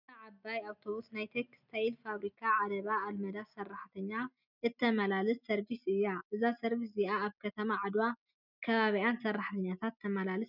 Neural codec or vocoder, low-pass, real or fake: none; 5.4 kHz; real